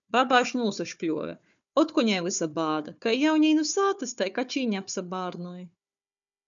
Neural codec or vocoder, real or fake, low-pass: codec, 16 kHz, 4 kbps, FunCodec, trained on Chinese and English, 50 frames a second; fake; 7.2 kHz